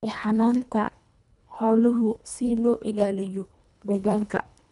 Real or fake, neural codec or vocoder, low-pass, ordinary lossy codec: fake; codec, 24 kHz, 1.5 kbps, HILCodec; 10.8 kHz; none